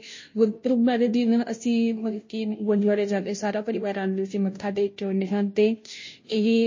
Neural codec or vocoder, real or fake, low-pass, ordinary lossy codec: codec, 16 kHz, 0.5 kbps, FunCodec, trained on Chinese and English, 25 frames a second; fake; 7.2 kHz; MP3, 32 kbps